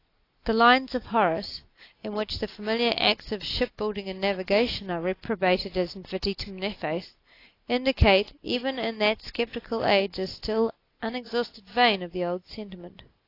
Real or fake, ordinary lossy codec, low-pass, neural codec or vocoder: real; AAC, 32 kbps; 5.4 kHz; none